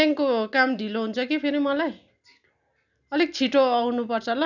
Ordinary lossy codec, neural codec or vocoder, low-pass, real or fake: none; none; 7.2 kHz; real